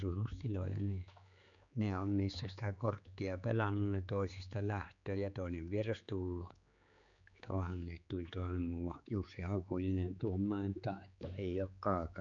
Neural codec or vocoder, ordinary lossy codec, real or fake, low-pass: codec, 16 kHz, 4 kbps, X-Codec, HuBERT features, trained on general audio; none; fake; 7.2 kHz